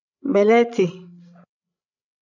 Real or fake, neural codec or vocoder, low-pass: fake; codec, 16 kHz, 16 kbps, FreqCodec, larger model; 7.2 kHz